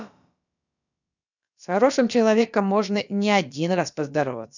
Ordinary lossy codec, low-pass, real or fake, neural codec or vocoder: none; 7.2 kHz; fake; codec, 16 kHz, about 1 kbps, DyCAST, with the encoder's durations